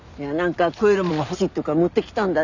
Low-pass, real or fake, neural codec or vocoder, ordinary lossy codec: 7.2 kHz; real; none; none